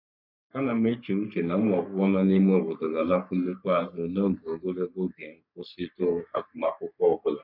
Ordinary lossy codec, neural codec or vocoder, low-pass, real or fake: none; codec, 44.1 kHz, 3.4 kbps, Pupu-Codec; 5.4 kHz; fake